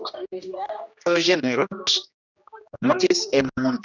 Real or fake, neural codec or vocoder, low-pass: fake; codec, 16 kHz, 1 kbps, X-Codec, HuBERT features, trained on general audio; 7.2 kHz